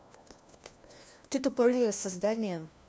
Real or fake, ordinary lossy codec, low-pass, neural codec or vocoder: fake; none; none; codec, 16 kHz, 1 kbps, FunCodec, trained on LibriTTS, 50 frames a second